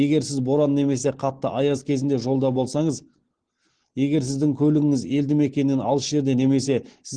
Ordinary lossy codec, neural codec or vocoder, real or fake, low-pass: Opus, 16 kbps; none; real; 9.9 kHz